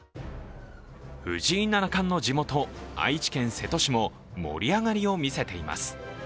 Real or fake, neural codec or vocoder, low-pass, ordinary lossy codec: real; none; none; none